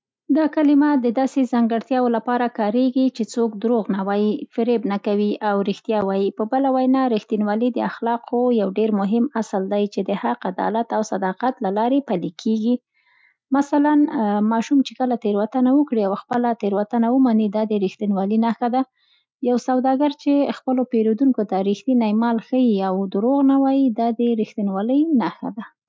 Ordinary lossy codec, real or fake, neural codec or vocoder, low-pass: none; real; none; none